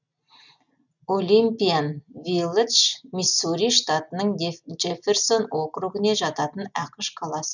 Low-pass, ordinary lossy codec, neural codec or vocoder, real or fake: 7.2 kHz; none; none; real